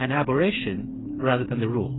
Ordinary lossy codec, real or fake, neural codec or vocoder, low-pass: AAC, 16 kbps; fake; codec, 16 kHz, 4 kbps, FreqCodec, smaller model; 7.2 kHz